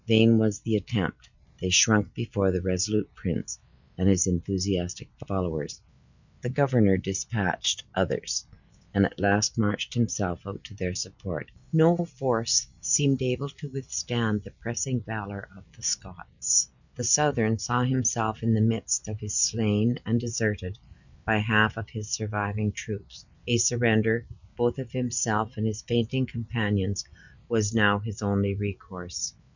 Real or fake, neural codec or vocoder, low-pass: real; none; 7.2 kHz